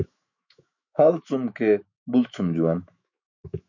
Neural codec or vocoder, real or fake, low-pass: autoencoder, 48 kHz, 128 numbers a frame, DAC-VAE, trained on Japanese speech; fake; 7.2 kHz